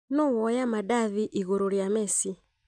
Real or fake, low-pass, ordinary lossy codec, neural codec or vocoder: real; 9.9 kHz; none; none